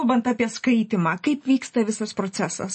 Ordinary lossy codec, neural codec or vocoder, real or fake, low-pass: MP3, 32 kbps; none; real; 10.8 kHz